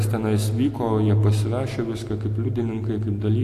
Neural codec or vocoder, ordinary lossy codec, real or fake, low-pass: none; AAC, 64 kbps; real; 14.4 kHz